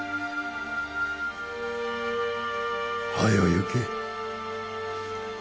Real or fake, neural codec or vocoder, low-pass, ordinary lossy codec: real; none; none; none